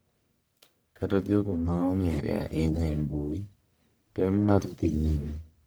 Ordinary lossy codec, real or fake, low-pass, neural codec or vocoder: none; fake; none; codec, 44.1 kHz, 1.7 kbps, Pupu-Codec